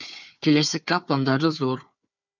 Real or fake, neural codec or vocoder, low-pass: fake; codec, 16 kHz, 4 kbps, FunCodec, trained on Chinese and English, 50 frames a second; 7.2 kHz